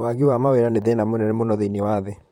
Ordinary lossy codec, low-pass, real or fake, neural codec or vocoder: MP3, 64 kbps; 19.8 kHz; fake; vocoder, 44.1 kHz, 128 mel bands, Pupu-Vocoder